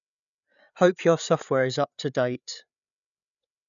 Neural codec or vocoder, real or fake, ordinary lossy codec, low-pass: codec, 16 kHz, 8 kbps, FreqCodec, larger model; fake; none; 7.2 kHz